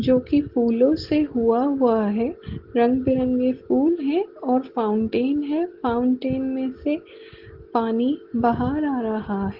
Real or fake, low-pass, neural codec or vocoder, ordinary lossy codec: real; 5.4 kHz; none; Opus, 16 kbps